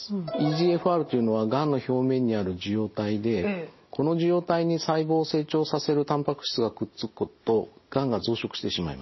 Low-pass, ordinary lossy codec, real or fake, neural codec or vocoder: 7.2 kHz; MP3, 24 kbps; real; none